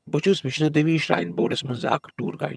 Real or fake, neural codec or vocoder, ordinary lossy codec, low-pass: fake; vocoder, 22.05 kHz, 80 mel bands, HiFi-GAN; none; none